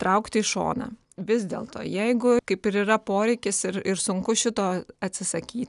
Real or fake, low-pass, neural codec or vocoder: real; 10.8 kHz; none